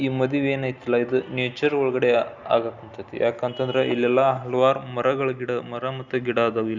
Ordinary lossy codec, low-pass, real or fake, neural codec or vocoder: Opus, 64 kbps; 7.2 kHz; real; none